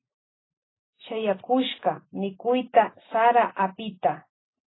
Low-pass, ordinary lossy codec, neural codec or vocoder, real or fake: 7.2 kHz; AAC, 16 kbps; none; real